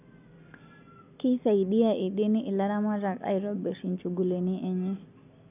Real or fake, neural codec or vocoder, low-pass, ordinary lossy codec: real; none; 3.6 kHz; none